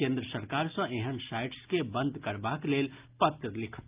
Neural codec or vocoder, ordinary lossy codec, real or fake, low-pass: none; Opus, 24 kbps; real; 3.6 kHz